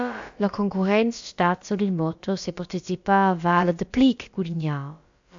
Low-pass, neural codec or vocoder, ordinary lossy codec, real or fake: 7.2 kHz; codec, 16 kHz, about 1 kbps, DyCAST, with the encoder's durations; AAC, 64 kbps; fake